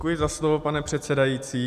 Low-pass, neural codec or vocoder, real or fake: 14.4 kHz; none; real